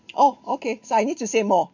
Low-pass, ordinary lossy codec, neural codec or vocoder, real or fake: 7.2 kHz; none; vocoder, 44.1 kHz, 128 mel bands every 256 samples, BigVGAN v2; fake